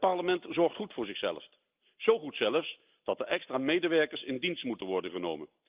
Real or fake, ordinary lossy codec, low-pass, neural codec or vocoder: real; Opus, 24 kbps; 3.6 kHz; none